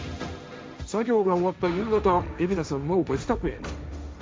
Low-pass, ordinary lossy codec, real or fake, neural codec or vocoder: none; none; fake; codec, 16 kHz, 1.1 kbps, Voila-Tokenizer